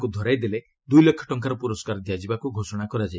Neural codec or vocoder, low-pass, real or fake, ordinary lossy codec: none; none; real; none